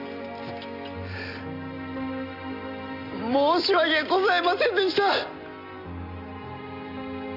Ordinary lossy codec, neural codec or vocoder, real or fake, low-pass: none; none; real; 5.4 kHz